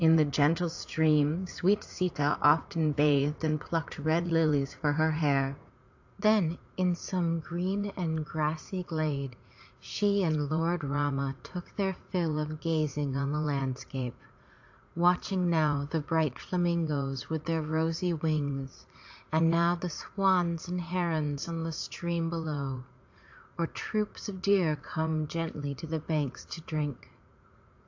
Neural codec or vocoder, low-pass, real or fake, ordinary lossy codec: vocoder, 44.1 kHz, 80 mel bands, Vocos; 7.2 kHz; fake; AAC, 48 kbps